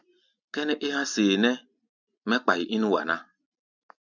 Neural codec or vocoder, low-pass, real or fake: none; 7.2 kHz; real